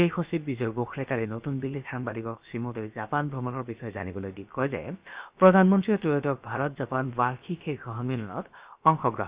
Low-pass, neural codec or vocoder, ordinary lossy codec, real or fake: 3.6 kHz; codec, 16 kHz, about 1 kbps, DyCAST, with the encoder's durations; Opus, 64 kbps; fake